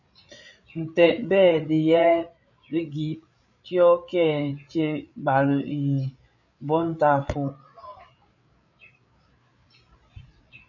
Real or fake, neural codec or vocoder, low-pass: fake; codec, 16 kHz, 8 kbps, FreqCodec, larger model; 7.2 kHz